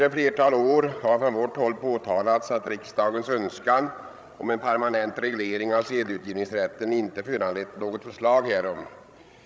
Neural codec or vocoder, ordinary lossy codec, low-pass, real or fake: codec, 16 kHz, 16 kbps, FreqCodec, larger model; none; none; fake